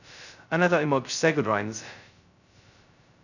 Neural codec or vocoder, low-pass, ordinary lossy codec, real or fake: codec, 16 kHz, 0.2 kbps, FocalCodec; 7.2 kHz; none; fake